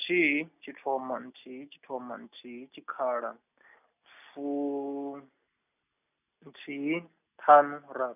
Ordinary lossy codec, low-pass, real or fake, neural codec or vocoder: none; 3.6 kHz; real; none